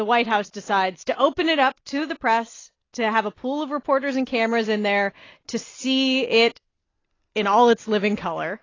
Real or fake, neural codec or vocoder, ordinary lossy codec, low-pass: real; none; AAC, 32 kbps; 7.2 kHz